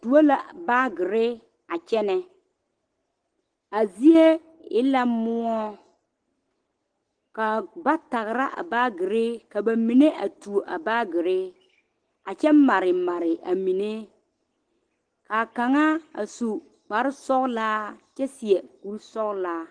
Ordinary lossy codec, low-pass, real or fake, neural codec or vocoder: Opus, 16 kbps; 9.9 kHz; real; none